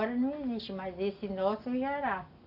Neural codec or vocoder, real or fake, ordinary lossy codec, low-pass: none; real; AAC, 48 kbps; 5.4 kHz